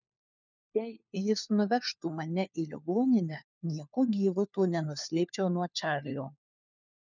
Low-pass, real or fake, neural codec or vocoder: 7.2 kHz; fake; codec, 16 kHz, 4 kbps, FunCodec, trained on LibriTTS, 50 frames a second